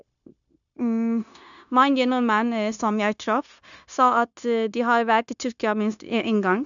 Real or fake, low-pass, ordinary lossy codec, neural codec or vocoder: fake; 7.2 kHz; none; codec, 16 kHz, 0.9 kbps, LongCat-Audio-Codec